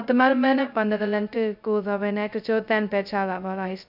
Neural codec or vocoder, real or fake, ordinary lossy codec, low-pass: codec, 16 kHz, 0.2 kbps, FocalCodec; fake; none; 5.4 kHz